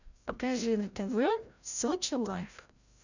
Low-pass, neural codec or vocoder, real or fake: 7.2 kHz; codec, 16 kHz, 0.5 kbps, FreqCodec, larger model; fake